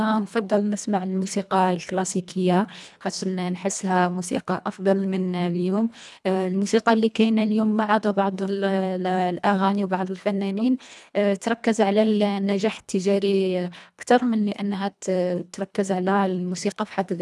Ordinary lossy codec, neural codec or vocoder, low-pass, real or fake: none; codec, 24 kHz, 1.5 kbps, HILCodec; none; fake